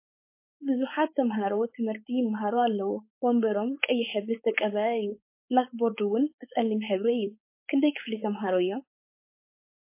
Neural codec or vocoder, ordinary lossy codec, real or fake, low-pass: codec, 16 kHz, 4.8 kbps, FACodec; MP3, 24 kbps; fake; 3.6 kHz